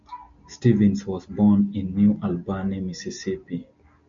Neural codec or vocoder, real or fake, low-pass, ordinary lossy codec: none; real; 7.2 kHz; MP3, 64 kbps